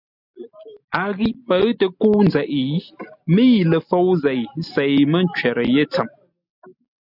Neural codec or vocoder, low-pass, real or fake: none; 5.4 kHz; real